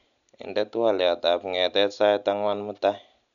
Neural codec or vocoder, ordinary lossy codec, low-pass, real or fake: none; none; 7.2 kHz; real